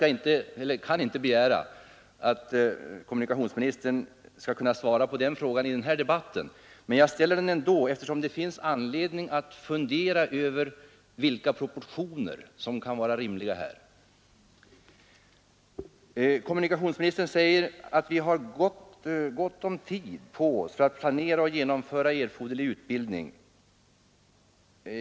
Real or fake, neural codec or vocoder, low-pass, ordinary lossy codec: real; none; none; none